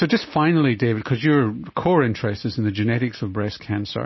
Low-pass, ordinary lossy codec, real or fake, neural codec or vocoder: 7.2 kHz; MP3, 24 kbps; real; none